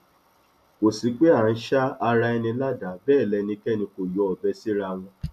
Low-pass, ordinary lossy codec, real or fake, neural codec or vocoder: 14.4 kHz; none; real; none